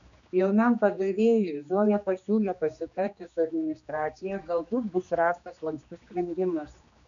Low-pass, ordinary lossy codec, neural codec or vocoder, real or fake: 7.2 kHz; MP3, 96 kbps; codec, 16 kHz, 2 kbps, X-Codec, HuBERT features, trained on general audio; fake